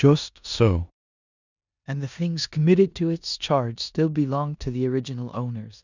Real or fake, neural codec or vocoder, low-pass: fake; codec, 16 kHz in and 24 kHz out, 0.9 kbps, LongCat-Audio-Codec, four codebook decoder; 7.2 kHz